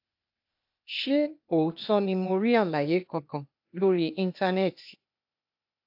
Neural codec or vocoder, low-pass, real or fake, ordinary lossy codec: codec, 16 kHz, 0.8 kbps, ZipCodec; 5.4 kHz; fake; AAC, 48 kbps